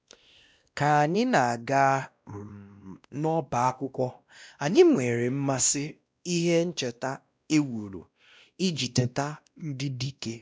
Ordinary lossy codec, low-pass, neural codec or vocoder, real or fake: none; none; codec, 16 kHz, 1 kbps, X-Codec, WavLM features, trained on Multilingual LibriSpeech; fake